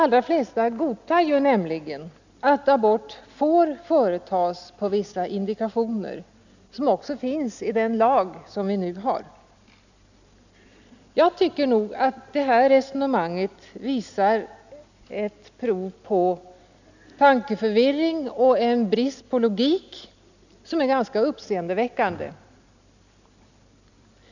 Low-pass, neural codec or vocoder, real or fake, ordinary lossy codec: 7.2 kHz; none; real; none